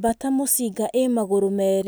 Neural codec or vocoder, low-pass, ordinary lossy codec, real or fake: none; none; none; real